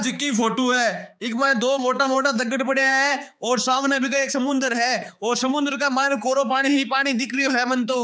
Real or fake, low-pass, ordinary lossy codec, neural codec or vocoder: fake; none; none; codec, 16 kHz, 4 kbps, X-Codec, HuBERT features, trained on balanced general audio